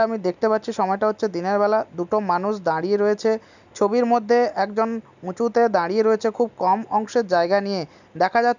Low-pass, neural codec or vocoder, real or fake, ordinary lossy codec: 7.2 kHz; none; real; none